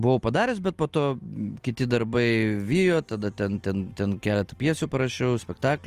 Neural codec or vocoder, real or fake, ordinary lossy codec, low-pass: none; real; Opus, 24 kbps; 14.4 kHz